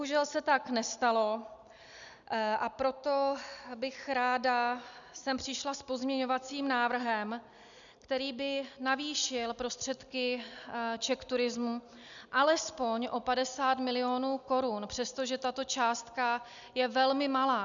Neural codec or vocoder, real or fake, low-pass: none; real; 7.2 kHz